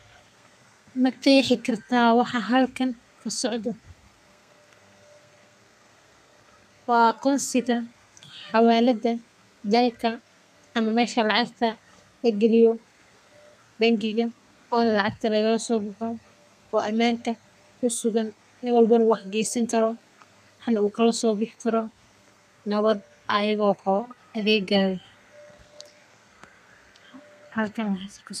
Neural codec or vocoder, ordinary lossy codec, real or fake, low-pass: codec, 32 kHz, 1.9 kbps, SNAC; none; fake; 14.4 kHz